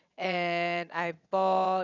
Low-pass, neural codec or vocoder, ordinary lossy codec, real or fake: 7.2 kHz; vocoder, 22.05 kHz, 80 mel bands, WaveNeXt; none; fake